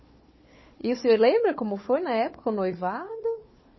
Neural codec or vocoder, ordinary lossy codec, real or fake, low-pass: codec, 16 kHz, 4 kbps, FunCodec, trained on Chinese and English, 50 frames a second; MP3, 24 kbps; fake; 7.2 kHz